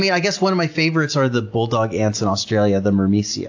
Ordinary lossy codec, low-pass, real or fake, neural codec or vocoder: AAC, 48 kbps; 7.2 kHz; real; none